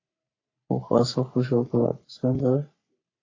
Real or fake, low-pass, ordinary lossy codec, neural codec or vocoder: fake; 7.2 kHz; AAC, 32 kbps; codec, 44.1 kHz, 3.4 kbps, Pupu-Codec